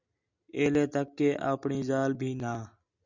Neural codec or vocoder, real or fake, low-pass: none; real; 7.2 kHz